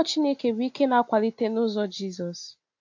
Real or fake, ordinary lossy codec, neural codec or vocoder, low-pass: real; AAC, 48 kbps; none; 7.2 kHz